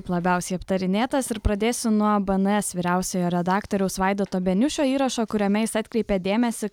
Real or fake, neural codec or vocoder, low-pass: real; none; 19.8 kHz